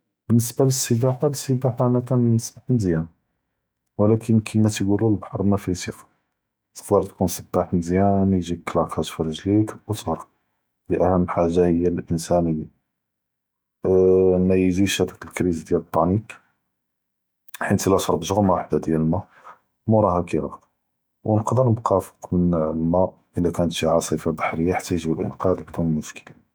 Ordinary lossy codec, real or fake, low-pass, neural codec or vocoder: none; fake; none; autoencoder, 48 kHz, 128 numbers a frame, DAC-VAE, trained on Japanese speech